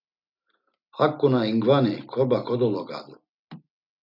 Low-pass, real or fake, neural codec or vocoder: 5.4 kHz; real; none